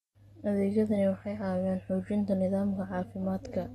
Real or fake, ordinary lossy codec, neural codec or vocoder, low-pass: real; AAC, 32 kbps; none; 19.8 kHz